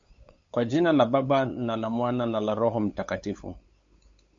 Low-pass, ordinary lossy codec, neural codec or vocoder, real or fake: 7.2 kHz; MP3, 48 kbps; codec, 16 kHz, 8 kbps, FunCodec, trained on LibriTTS, 25 frames a second; fake